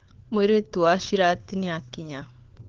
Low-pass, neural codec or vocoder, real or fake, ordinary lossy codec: 7.2 kHz; codec, 16 kHz, 4 kbps, FunCodec, trained on LibriTTS, 50 frames a second; fake; Opus, 32 kbps